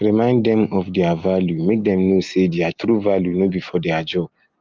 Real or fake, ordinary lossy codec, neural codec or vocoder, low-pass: real; Opus, 32 kbps; none; 7.2 kHz